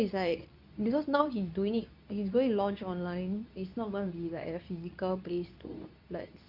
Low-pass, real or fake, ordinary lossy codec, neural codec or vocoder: 5.4 kHz; fake; none; codec, 24 kHz, 0.9 kbps, WavTokenizer, medium speech release version 2